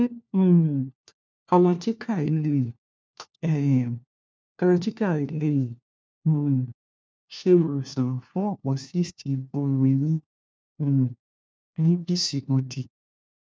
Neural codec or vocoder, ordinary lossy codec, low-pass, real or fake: codec, 16 kHz, 1 kbps, FunCodec, trained on LibriTTS, 50 frames a second; none; none; fake